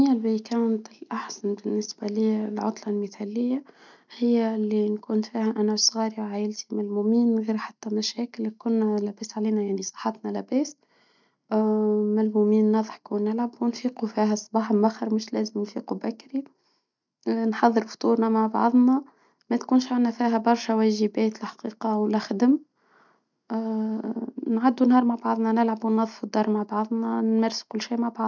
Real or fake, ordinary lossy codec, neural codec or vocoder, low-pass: real; none; none; 7.2 kHz